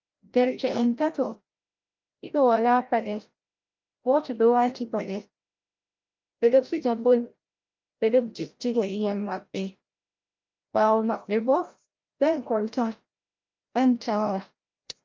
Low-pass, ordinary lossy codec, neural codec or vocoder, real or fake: 7.2 kHz; Opus, 24 kbps; codec, 16 kHz, 0.5 kbps, FreqCodec, larger model; fake